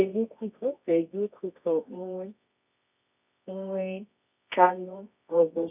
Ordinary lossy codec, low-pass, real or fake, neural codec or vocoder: none; 3.6 kHz; fake; codec, 24 kHz, 0.9 kbps, WavTokenizer, medium music audio release